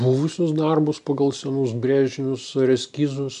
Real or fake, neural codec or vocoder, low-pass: real; none; 10.8 kHz